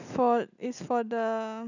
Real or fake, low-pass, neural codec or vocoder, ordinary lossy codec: real; 7.2 kHz; none; none